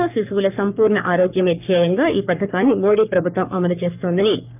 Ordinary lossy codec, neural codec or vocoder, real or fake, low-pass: none; codec, 44.1 kHz, 3.4 kbps, Pupu-Codec; fake; 3.6 kHz